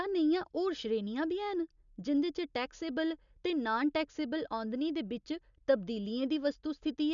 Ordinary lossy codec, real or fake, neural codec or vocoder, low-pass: none; real; none; 7.2 kHz